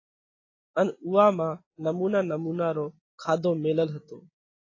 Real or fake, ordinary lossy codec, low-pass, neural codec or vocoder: real; AAC, 32 kbps; 7.2 kHz; none